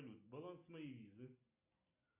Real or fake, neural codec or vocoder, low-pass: real; none; 3.6 kHz